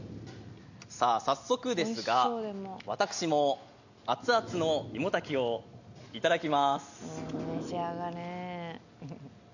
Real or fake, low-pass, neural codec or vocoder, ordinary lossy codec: real; 7.2 kHz; none; none